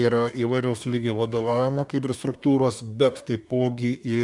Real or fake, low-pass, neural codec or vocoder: fake; 10.8 kHz; codec, 24 kHz, 1 kbps, SNAC